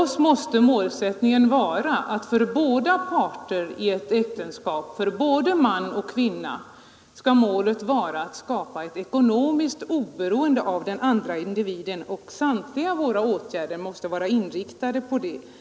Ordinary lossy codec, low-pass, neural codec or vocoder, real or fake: none; none; none; real